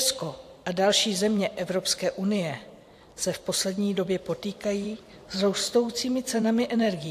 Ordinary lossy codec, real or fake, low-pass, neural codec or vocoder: AAC, 64 kbps; fake; 14.4 kHz; vocoder, 44.1 kHz, 128 mel bands every 512 samples, BigVGAN v2